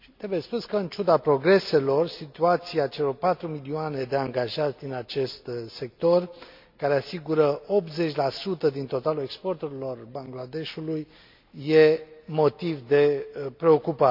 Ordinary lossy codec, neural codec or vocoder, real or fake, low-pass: MP3, 48 kbps; none; real; 5.4 kHz